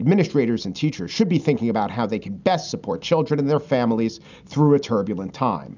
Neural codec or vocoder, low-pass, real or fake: none; 7.2 kHz; real